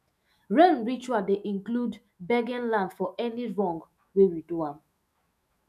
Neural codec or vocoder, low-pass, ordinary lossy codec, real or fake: autoencoder, 48 kHz, 128 numbers a frame, DAC-VAE, trained on Japanese speech; 14.4 kHz; none; fake